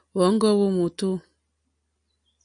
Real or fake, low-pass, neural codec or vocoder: real; 9.9 kHz; none